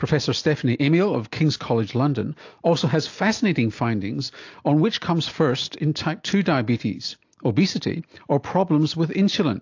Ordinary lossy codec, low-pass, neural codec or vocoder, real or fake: AAC, 48 kbps; 7.2 kHz; none; real